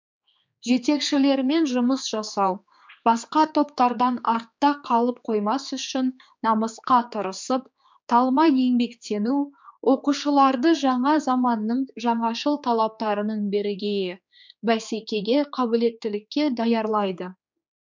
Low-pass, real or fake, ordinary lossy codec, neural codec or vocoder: 7.2 kHz; fake; MP3, 64 kbps; codec, 16 kHz, 4 kbps, X-Codec, HuBERT features, trained on general audio